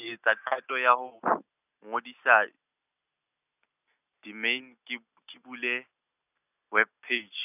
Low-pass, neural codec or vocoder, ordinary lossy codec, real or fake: 3.6 kHz; none; none; real